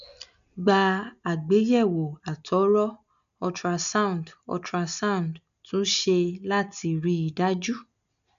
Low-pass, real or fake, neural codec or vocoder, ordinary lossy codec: 7.2 kHz; real; none; none